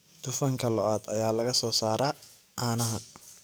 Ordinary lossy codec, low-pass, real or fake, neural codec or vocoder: none; none; fake; codec, 44.1 kHz, 7.8 kbps, DAC